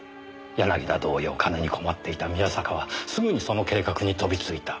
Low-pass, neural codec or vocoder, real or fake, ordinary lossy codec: none; none; real; none